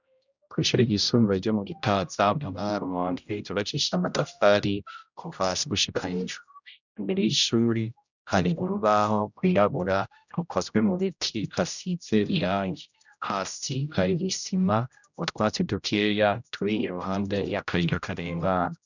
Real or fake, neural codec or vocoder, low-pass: fake; codec, 16 kHz, 0.5 kbps, X-Codec, HuBERT features, trained on general audio; 7.2 kHz